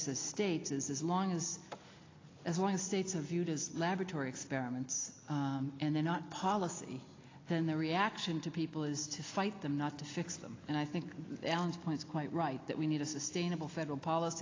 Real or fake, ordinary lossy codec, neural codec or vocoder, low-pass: real; AAC, 32 kbps; none; 7.2 kHz